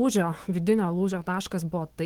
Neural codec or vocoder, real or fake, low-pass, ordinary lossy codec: autoencoder, 48 kHz, 128 numbers a frame, DAC-VAE, trained on Japanese speech; fake; 19.8 kHz; Opus, 16 kbps